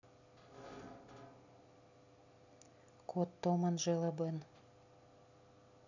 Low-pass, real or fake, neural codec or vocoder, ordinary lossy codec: 7.2 kHz; real; none; none